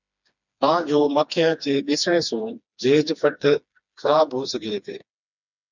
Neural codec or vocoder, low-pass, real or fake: codec, 16 kHz, 2 kbps, FreqCodec, smaller model; 7.2 kHz; fake